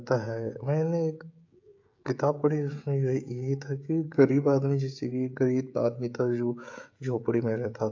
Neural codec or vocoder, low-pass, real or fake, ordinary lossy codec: codec, 16 kHz, 16 kbps, FreqCodec, smaller model; 7.2 kHz; fake; AAC, 48 kbps